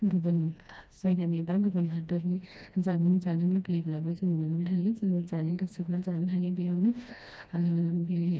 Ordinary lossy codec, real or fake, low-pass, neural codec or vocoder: none; fake; none; codec, 16 kHz, 1 kbps, FreqCodec, smaller model